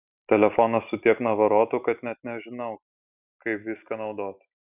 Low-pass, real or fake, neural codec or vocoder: 3.6 kHz; real; none